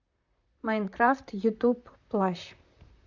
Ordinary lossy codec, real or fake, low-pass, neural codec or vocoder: Opus, 64 kbps; fake; 7.2 kHz; vocoder, 44.1 kHz, 128 mel bands, Pupu-Vocoder